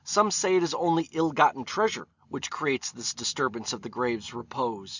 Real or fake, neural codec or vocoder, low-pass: real; none; 7.2 kHz